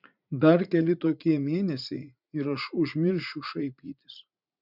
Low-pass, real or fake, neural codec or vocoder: 5.4 kHz; fake; vocoder, 44.1 kHz, 80 mel bands, Vocos